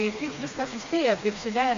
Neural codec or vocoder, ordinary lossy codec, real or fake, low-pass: codec, 16 kHz, 2 kbps, FreqCodec, smaller model; MP3, 64 kbps; fake; 7.2 kHz